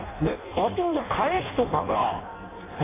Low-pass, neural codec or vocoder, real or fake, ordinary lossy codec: 3.6 kHz; codec, 16 kHz in and 24 kHz out, 0.6 kbps, FireRedTTS-2 codec; fake; AAC, 16 kbps